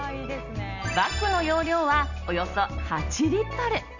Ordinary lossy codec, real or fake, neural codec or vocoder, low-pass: none; real; none; 7.2 kHz